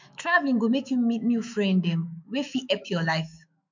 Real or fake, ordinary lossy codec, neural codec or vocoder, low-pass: fake; MP3, 64 kbps; autoencoder, 48 kHz, 128 numbers a frame, DAC-VAE, trained on Japanese speech; 7.2 kHz